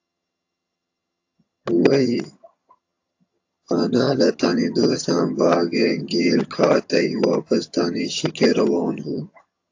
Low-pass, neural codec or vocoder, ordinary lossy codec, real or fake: 7.2 kHz; vocoder, 22.05 kHz, 80 mel bands, HiFi-GAN; AAC, 48 kbps; fake